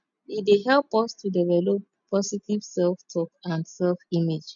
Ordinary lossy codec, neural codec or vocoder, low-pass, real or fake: none; none; 7.2 kHz; real